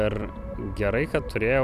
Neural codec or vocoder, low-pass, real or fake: vocoder, 44.1 kHz, 128 mel bands every 256 samples, BigVGAN v2; 14.4 kHz; fake